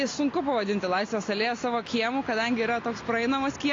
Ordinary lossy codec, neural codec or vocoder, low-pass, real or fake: AAC, 32 kbps; none; 7.2 kHz; real